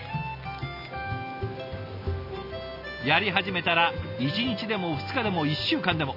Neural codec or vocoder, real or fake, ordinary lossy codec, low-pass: none; real; none; 5.4 kHz